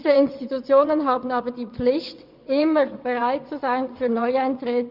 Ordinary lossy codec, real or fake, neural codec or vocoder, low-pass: Opus, 64 kbps; fake; vocoder, 22.05 kHz, 80 mel bands, WaveNeXt; 5.4 kHz